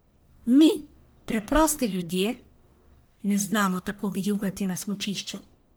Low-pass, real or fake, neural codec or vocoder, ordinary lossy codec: none; fake; codec, 44.1 kHz, 1.7 kbps, Pupu-Codec; none